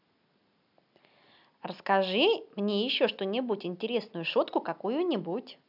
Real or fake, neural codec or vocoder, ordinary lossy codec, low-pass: real; none; none; 5.4 kHz